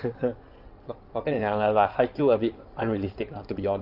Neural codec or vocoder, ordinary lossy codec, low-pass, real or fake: codec, 16 kHz in and 24 kHz out, 2.2 kbps, FireRedTTS-2 codec; Opus, 24 kbps; 5.4 kHz; fake